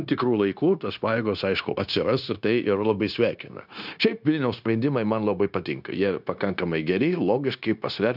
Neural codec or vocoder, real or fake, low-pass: codec, 16 kHz, 0.9 kbps, LongCat-Audio-Codec; fake; 5.4 kHz